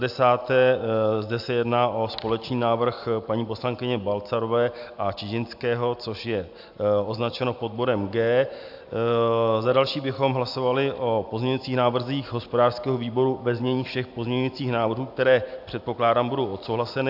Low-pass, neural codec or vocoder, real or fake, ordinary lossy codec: 5.4 kHz; none; real; AAC, 48 kbps